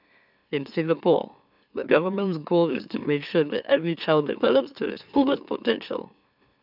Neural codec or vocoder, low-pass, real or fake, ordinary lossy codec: autoencoder, 44.1 kHz, a latent of 192 numbers a frame, MeloTTS; 5.4 kHz; fake; none